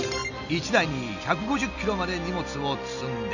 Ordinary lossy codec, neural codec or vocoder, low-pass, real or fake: none; none; 7.2 kHz; real